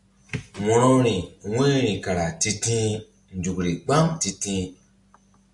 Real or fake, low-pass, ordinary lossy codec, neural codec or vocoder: real; 10.8 kHz; MP3, 96 kbps; none